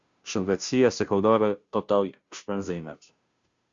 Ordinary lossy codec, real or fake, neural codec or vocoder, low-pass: Opus, 64 kbps; fake; codec, 16 kHz, 0.5 kbps, FunCodec, trained on Chinese and English, 25 frames a second; 7.2 kHz